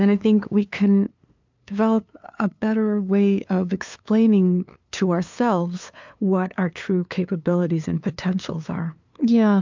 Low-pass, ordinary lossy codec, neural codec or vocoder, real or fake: 7.2 kHz; MP3, 64 kbps; codec, 16 kHz, 2 kbps, FunCodec, trained on LibriTTS, 25 frames a second; fake